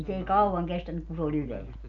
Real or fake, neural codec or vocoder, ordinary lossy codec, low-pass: real; none; none; 7.2 kHz